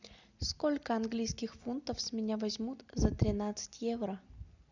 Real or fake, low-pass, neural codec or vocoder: real; 7.2 kHz; none